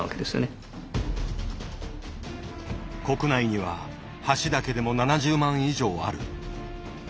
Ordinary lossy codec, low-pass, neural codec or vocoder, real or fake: none; none; none; real